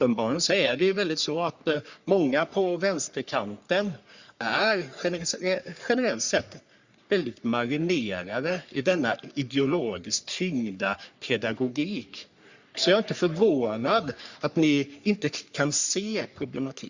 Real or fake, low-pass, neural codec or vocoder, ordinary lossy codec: fake; 7.2 kHz; codec, 44.1 kHz, 3.4 kbps, Pupu-Codec; Opus, 64 kbps